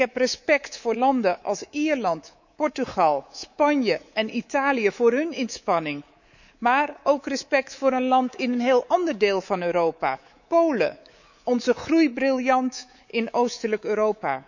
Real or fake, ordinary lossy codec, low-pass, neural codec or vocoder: fake; none; 7.2 kHz; codec, 24 kHz, 3.1 kbps, DualCodec